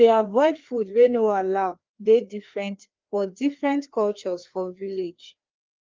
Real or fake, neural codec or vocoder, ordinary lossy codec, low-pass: fake; codec, 16 kHz, 2 kbps, FreqCodec, larger model; Opus, 32 kbps; 7.2 kHz